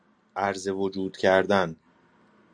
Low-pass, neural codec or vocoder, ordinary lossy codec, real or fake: 9.9 kHz; none; AAC, 64 kbps; real